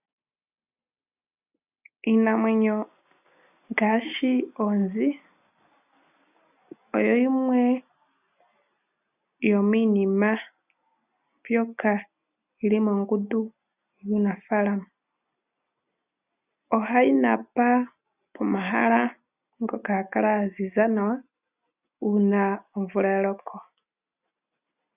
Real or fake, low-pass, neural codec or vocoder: real; 3.6 kHz; none